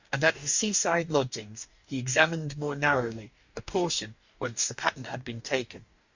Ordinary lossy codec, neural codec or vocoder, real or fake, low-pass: Opus, 64 kbps; codec, 44.1 kHz, 2.6 kbps, DAC; fake; 7.2 kHz